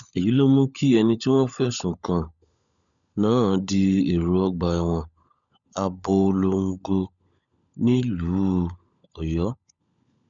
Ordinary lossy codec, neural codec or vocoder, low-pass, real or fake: none; codec, 16 kHz, 16 kbps, FunCodec, trained on LibriTTS, 50 frames a second; 7.2 kHz; fake